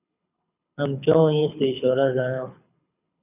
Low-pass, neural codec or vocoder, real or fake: 3.6 kHz; codec, 24 kHz, 6 kbps, HILCodec; fake